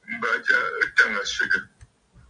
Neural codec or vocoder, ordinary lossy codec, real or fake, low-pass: none; MP3, 96 kbps; real; 9.9 kHz